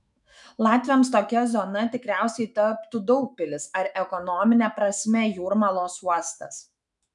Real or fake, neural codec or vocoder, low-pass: fake; autoencoder, 48 kHz, 128 numbers a frame, DAC-VAE, trained on Japanese speech; 10.8 kHz